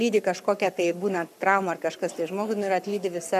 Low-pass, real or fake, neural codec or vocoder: 14.4 kHz; fake; codec, 44.1 kHz, 7.8 kbps, Pupu-Codec